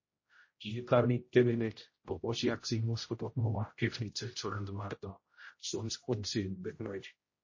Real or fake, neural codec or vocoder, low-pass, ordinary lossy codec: fake; codec, 16 kHz, 0.5 kbps, X-Codec, HuBERT features, trained on general audio; 7.2 kHz; MP3, 32 kbps